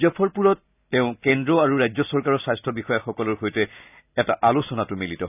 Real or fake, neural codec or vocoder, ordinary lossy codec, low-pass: real; none; none; 3.6 kHz